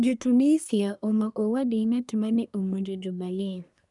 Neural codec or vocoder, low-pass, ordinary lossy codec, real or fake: codec, 24 kHz, 1 kbps, SNAC; 10.8 kHz; none; fake